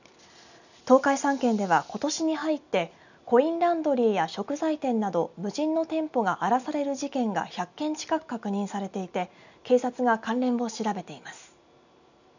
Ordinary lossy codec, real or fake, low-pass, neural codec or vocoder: none; real; 7.2 kHz; none